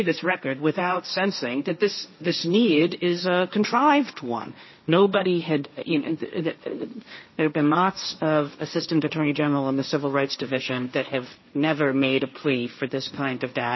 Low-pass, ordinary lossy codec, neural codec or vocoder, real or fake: 7.2 kHz; MP3, 24 kbps; codec, 16 kHz, 1.1 kbps, Voila-Tokenizer; fake